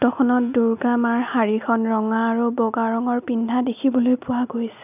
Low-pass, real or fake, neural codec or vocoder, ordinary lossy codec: 3.6 kHz; real; none; none